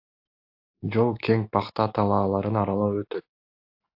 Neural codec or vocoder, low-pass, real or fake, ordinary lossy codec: none; 5.4 kHz; real; AAC, 48 kbps